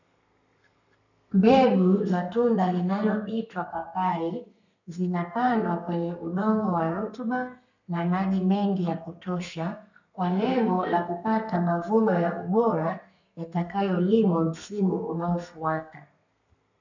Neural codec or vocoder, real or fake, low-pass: codec, 32 kHz, 1.9 kbps, SNAC; fake; 7.2 kHz